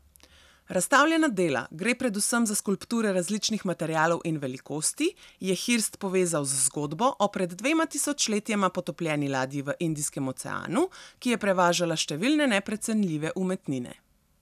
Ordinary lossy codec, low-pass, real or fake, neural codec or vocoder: none; 14.4 kHz; real; none